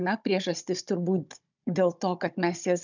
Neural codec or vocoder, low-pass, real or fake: codec, 16 kHz, 16 kbps, FunCodec, trained on Chinese and English, 50 frames a second; 7.2 kHz; fake